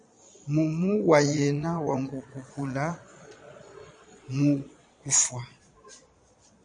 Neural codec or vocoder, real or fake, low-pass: vocoder, 22.05 kHz, 80 mel bands, Vocos; fake; 9.9 kHz